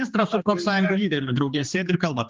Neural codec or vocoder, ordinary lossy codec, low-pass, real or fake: codec, 16 kHz, 2 kbps, X-Codec, HuBERT features, trained on general audio; Opus, 24 kbps; 7.2 kHz; fake